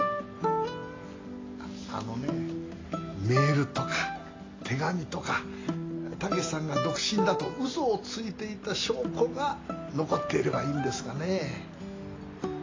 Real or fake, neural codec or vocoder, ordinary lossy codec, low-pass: real; none; AAC, 32 kbps; 7.2 kHz